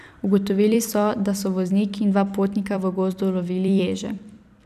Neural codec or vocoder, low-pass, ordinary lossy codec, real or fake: vocoder, 44.1 kHz, 128 mel bands every 256 samples, BigVGAN v2; 14.4 kHz; none; fake